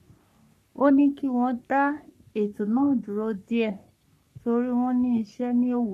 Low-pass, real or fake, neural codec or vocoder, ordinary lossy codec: 14.4 kHz; fake; codec, 44.1 kHz, 3.4 kbps, Pupu-Codec; none